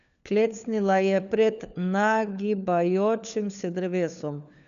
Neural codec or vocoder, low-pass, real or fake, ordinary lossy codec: codec, 16 kHz, 4 kbps, FunCodec, trained on LibriTTS, 50 frames a second; 7.2 kHz; fake; none